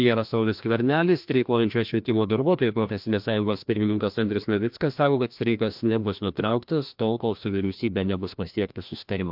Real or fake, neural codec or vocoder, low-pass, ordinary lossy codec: fake; codec, 16 kHz, 1 kbps, FreqCodec, larger model; 5.4 kHz; MP3, 48 kbps